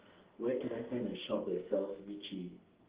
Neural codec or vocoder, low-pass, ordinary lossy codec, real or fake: codec, 44.1 kHz, 2.6 kbps, SNAC; 3.6 kHz; Opus, 16 kbps; fake